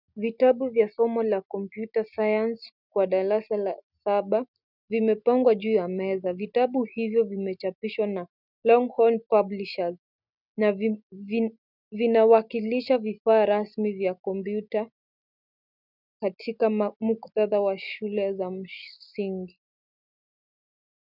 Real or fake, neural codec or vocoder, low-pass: real; none; 5.4 kHz